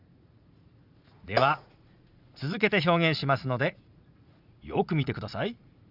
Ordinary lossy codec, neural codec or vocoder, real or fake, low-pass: Opus, 64 kbps; vocoder, 44.1 kHz, 80 mel bands, Vocos; fake; 5.4 kHz